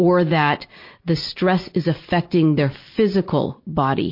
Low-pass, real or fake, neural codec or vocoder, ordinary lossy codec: 5.4 kHz; real; none; MP3, 32 kbps